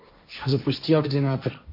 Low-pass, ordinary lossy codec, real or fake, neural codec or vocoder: 5.4 kHz; MP3, 48 kbps; fake; codec, 16 kHz, 1.1 kbps, Voila-Tokenizer